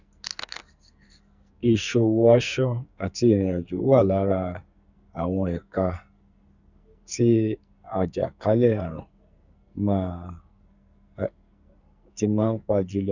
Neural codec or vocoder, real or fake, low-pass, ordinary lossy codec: codec, 44.1 kHz, 2.6 kbps, SNAC; fake; 7.2 kHz; none